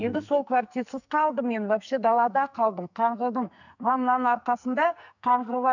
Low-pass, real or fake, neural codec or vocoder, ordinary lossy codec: 7.2 kHz; fake; codec, 44.1 kHz, 2.6 kbps, SNAC; none